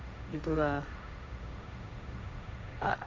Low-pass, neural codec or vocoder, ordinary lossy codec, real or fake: none; codec, 16 kHz, 1.1 kbps, Voila-Tokenizer; none; fake